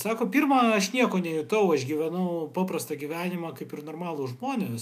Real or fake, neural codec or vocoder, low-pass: fake; autoencoder, 48 kHz, 128 numbers a frame, DAC-VAE, trained on Japanese speech; 14.4 kHz